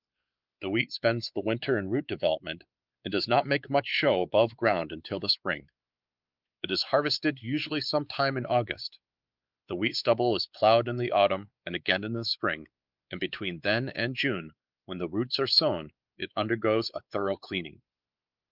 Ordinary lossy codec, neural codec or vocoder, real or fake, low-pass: Opus, 32 kbps; codec, 16 kHz, 4 kbps, X-Codec, WavLM features, trained on Multilingual LibriSpeech; fake; 5.4 kHz